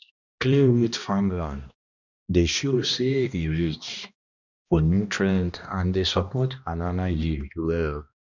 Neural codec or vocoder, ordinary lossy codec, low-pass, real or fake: codec, 16 kHz, 1 kbps, X-Codec, HuBERT features, trained on balanced general audio; none; 7.2 kHz; fake